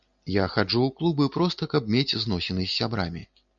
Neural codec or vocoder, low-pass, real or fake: none; 7.2 kHz; real